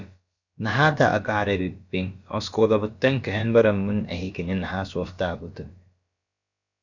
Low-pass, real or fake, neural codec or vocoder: 7.2 kHz; fake; codec, 16 kHz, about 1 kbps, DyCAST, with the encoder's durations